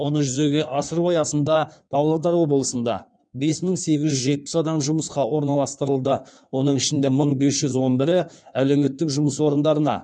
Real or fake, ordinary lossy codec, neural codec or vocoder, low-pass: fake; none; codec, 16 kHz in and 24 kHz out, 1.1 kbps, FireRedTTS-2 codec; 9.9 kHz